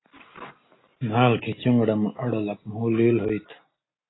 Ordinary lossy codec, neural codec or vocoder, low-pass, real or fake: AAC, 16 kbps; none; 7.2 kHz; real